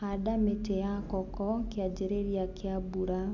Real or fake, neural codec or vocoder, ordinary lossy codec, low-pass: real; none; none; 7.2 kHz